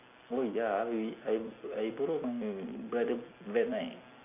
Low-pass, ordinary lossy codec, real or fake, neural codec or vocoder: 3.6 kHz; none; real; none